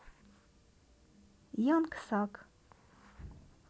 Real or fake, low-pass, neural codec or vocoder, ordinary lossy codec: real; none; none; none